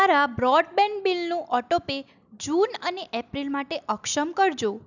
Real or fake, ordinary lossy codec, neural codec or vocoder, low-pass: real; none; none; 7.2 kHz